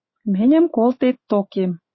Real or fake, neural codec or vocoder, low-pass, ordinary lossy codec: fake; autoencoder, 48 kHz, 128 numbers a frame, DAC-VAE, trained on Japanese speech; 7.2 kHz; MP3, 24 kbps